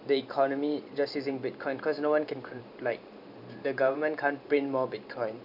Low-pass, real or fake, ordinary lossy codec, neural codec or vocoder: 5.4 kHz; fake; none; vocoder, 44.1 kHz, 128 mel bands every 512 samples, BigVGAN v2